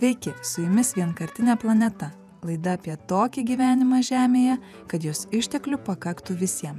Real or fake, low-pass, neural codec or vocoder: fake; 14.4 kHz; vocoder, 48 kHz, 128 mel bands, Vocos